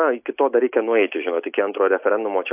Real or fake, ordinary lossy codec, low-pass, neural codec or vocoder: real; AAC, 32 kbps; 3.6 kHz; none